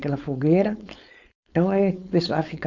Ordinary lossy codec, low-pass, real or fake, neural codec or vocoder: none; 7.2 kHz; fake; codec, 16 kHz, 4.8 kbps, FACodec